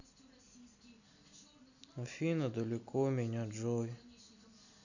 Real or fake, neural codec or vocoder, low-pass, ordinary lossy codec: real; none; 7.2 kHz; none